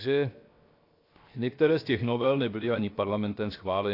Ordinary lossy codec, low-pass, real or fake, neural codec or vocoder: AAC, 32 kbps; 5.4 kHz; fake; codec, 16 kHz, 0.7 kbps, FocalCodec